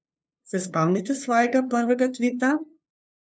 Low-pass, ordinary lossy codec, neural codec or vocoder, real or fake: none; none; codec, 16 kHz, 2 kbps, FunCodec, trained on LibriTTS, 25 frames a second; fake